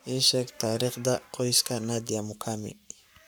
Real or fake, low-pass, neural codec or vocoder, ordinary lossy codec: fake; none; codec, 44.1 kHz, 7.8 kbps, Pupu-Codec; none